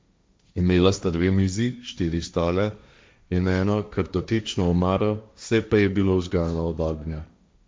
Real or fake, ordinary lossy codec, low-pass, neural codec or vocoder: fake; none; none; codec, 16 kHz, 1.1 kbps, Voila-Tokenizer